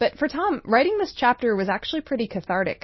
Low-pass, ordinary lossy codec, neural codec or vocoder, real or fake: 7.2 kHz; MP3, 24 kbps; none; real